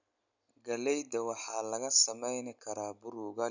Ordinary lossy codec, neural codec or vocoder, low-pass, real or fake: none; none; 7.2 kHz; real